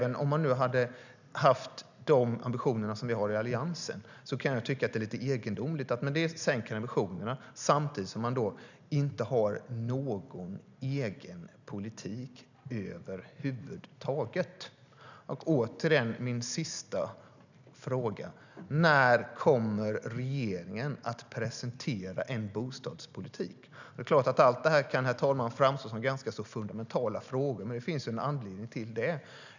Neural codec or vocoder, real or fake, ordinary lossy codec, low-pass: none; real; none; 7.2 kHz